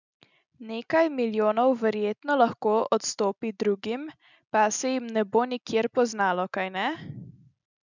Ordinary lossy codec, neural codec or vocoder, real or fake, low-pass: none; none; real; 7.2 kHz